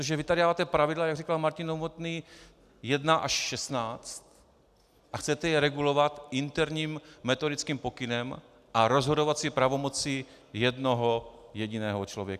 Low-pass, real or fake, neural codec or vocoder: 14.4 kHz; real; none